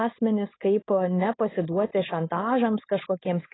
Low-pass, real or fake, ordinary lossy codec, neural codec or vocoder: 7.2 kHz; fake; AAC, 16 kbps; codec, 16 kHz, 4.8 kbps, FACodec